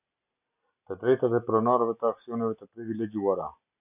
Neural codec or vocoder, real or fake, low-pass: none; real; 3.6 kHz